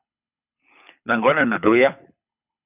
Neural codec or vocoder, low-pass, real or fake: codec, 24 kHz, 6 kbps, HILCodec; 3.6 kHz; fake